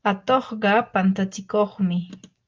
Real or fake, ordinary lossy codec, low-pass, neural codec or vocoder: real; Opus, 24 kbps; 7.2 kHz; none